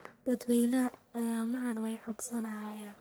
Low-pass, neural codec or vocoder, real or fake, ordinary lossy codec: none; codec, 44.1 kHz, 1.7 kbps, Pupu-Codec; fake; none